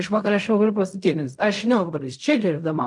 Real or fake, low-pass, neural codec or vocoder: fake; 10.8 kHz; codec, 16 kHz in and 24 kHz out, 0.4 kbps, LongCat-Audio-Codec, fine tuned four codebook decoder